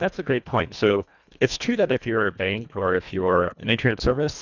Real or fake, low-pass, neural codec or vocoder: fake; 7.2 kHz; codec, 24 kHz, 1.5 kbps, HILCodec